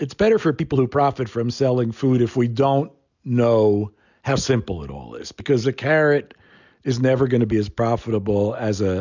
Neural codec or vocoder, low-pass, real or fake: none; 7.2 kHz; real